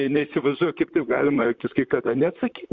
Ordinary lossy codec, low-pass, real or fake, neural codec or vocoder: Opus, 64 kbps; 7.2 kHz; fake; vocoder, 44.1 kHz, 128 mel bands, Pupu-Vocoder